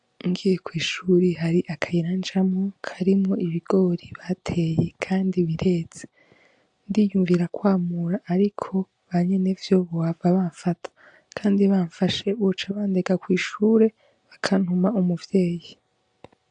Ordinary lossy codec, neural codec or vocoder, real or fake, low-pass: AAC, 64 kbps; none; real; 9.9 kHz